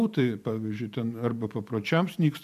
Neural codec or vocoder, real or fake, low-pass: vocoder, 44.1 kHz, 128 mel bands every 512 samples, BigVGAN v2; fake; 14.4 kHz